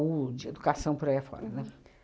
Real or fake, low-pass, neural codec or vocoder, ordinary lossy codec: real; none; none; none